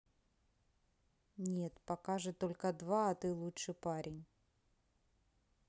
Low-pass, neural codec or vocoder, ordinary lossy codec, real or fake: none; none; none; real